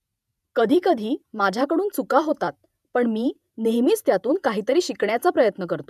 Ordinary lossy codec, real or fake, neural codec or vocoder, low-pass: none; fake; vocoder, 48 kHz, 128 mel bands, Vocos; 14.4 kHz